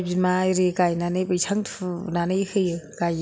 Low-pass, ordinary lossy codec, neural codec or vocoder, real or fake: none; none; none; real